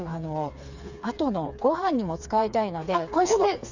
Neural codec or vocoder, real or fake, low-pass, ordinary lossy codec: codec, 16 kHz, 4 kbps, FreqCodec, smaller model; fake; 7.2 kHz; none